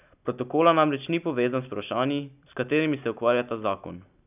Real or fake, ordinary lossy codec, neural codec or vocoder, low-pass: real; none; none; 3.6 kHz